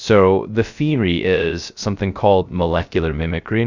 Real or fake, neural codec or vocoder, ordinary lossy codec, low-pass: fake; codec, 16 kHz, 0.3 kbps, FocalCodec; Opus, 64 kbps; 7.2 kHz